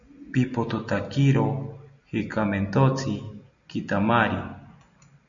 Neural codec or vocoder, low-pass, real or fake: none; 7.2 kHz; real